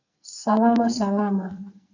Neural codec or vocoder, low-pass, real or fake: codec, 44.1 kHz, 2.6 kbps, SNAC; 7.2 kHz; fake